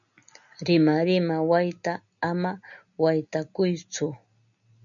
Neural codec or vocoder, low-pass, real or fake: none; 7.2 kHz; real